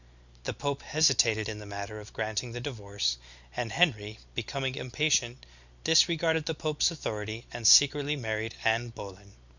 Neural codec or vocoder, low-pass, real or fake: none; 7.2 kHz; real